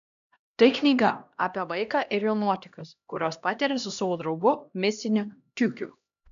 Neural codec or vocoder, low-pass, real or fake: codec, 16 kHz, 1 kbps, X-Codec, HuBERT features, trained on LibriSpeech; 7.2 kHz; fake